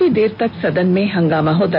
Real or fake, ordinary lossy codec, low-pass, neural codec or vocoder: real; MP3, 48 kbps; 5.4 kHz; none